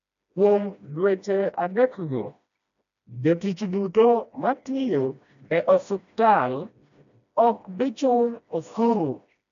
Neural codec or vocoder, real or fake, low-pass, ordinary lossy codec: codec, 16 kHz, 1 kbps, FreqCodec, smaller model; fake; 7.2 kHz; none